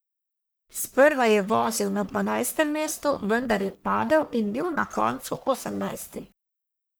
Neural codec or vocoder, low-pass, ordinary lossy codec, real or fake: codec, 44.1 kHz, 1.7 kbps, Pupu-Codec; none; none; fake